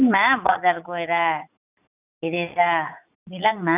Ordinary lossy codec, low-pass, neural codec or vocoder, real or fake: none; 3.6 kHz; vocoder, 44.1 kHz, 80 mel bands, Vocos; fake